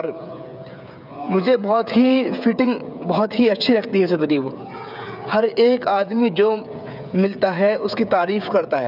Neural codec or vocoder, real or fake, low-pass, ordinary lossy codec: codec, 16 kHz, 8 kbps, FreqCodec, smaller model; fake; 5.4 kHz; none